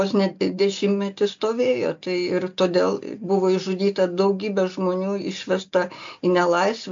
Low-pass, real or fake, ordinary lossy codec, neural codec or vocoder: 7.2 kHz; real; AAC, 64 kbps; none